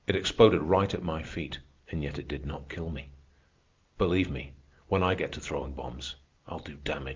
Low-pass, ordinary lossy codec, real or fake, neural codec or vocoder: 7.2 kHz; Opus, 24 kbps; real; none